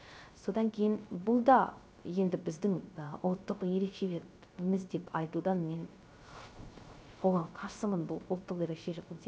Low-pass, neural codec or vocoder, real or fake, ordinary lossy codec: none; codec, 16 kHz, 0.3 kbps, FocalCodec; fake; none